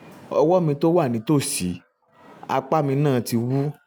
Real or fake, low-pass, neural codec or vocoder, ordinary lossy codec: real; none; none; none